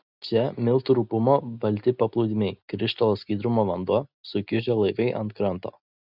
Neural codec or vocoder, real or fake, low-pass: none; real; 5.4 kHz